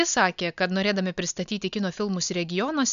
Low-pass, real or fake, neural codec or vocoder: 7.2 kHz; real; none